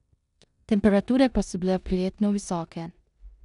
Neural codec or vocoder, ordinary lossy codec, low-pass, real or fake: codec, 16 kHz in and 24 kHz out, 0.9 kbps, LongCat-Audio-Codec, four codebook decoder; none; 10.8 kHz; fake